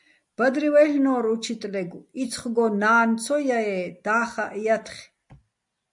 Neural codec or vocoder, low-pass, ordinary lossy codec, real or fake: none; 10.8 kHz; MP3, 96 kbps; real